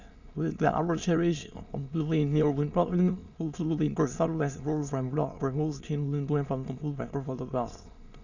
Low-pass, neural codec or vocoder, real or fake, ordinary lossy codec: 7.2 kHz; autoencoder, 22.05 kHz, a latent of 192 numbers a frame, VITS, trained on many speakers; fake; Opus, 64 kbps